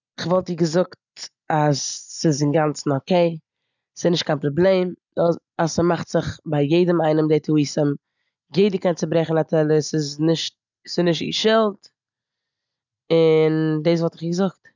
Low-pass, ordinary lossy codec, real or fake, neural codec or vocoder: 7.2 kHz; none; real; none